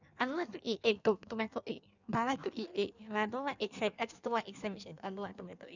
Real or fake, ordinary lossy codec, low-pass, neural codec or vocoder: fake; none; 7.2 kHz; codec, 16 kHz in and 24 kHz out, 1.1 kbps, FireRedTTS-2 codec